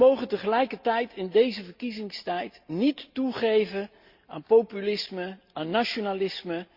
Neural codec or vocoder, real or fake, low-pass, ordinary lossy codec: none; real; 5.4 kHz; Opus, 64 kbps